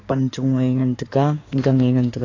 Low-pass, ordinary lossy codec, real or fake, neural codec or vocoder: 7.2 kHz; AAC, 32 kbps; fake; codec, 16 kHz in and 24 kHz out, 2.2 kbps, FireRedTTS-2 codec